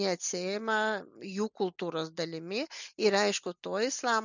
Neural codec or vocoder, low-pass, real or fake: none; 7.2 kHz; real